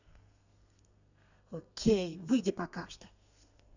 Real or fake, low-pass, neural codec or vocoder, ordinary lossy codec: fake; 7.2 kHz; codec, 32 kHz, 1.9 kbps, SNAC; none